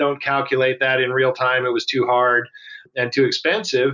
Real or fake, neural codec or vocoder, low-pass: real; none; 7.2 kHz